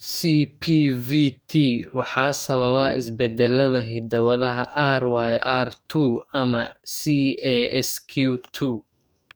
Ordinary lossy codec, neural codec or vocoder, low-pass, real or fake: none; codec, 44.1 kHz, 2.6 kbps, DAC; none; fake